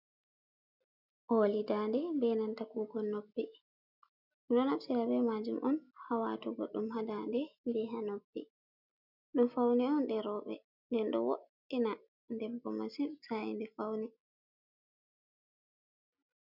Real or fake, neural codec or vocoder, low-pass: real; none; 5.4 kHz